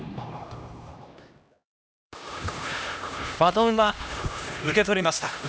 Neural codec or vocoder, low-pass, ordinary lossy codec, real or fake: codec, 16 kHz, 1 kbps, X-Codec, HuBERT features, trained on LibriSpeech; none; none; fake